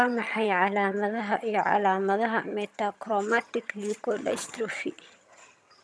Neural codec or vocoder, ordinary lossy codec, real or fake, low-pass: vocoder, 22.05 kHz, 80 mel bands, HiFi-GAN; none; fake; none